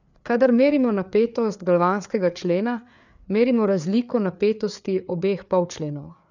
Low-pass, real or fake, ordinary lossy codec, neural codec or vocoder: 7.2 kHz; fake; none; codec, 16 kHz, 4 kbps, FreqCodec, larger model